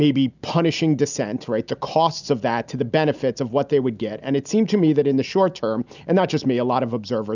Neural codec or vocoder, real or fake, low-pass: none; real; 7.2 kHz